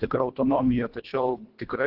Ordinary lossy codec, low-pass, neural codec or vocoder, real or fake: Opus, 16 kbps; 5.4 kHz; codec, 24 kHz, 1.5 kbps, HILCodec; fake